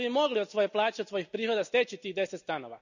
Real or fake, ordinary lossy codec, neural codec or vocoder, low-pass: real; none; none; 7.2 kHz